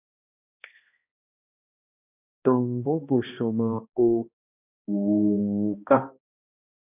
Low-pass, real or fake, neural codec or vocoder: 3.6 kHz; fake; codec, 16 kHz, 1 kbps, X-Codec, HuBERT features, trained on balanced general audio